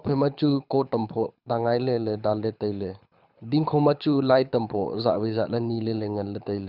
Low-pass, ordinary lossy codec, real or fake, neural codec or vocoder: 5.4 kHz; none; fake; codec, 24 kHz, 6 kbps, HILCodec